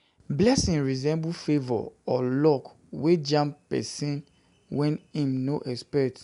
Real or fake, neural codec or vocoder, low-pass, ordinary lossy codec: real; none; 10.8 kHz; none